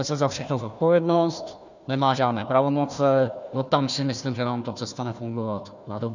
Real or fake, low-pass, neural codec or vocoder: fake; 7.2 kHz; codec, 16 kHz, 1 kbps, FunCodec, trained on Chinese and English, 50 frames a second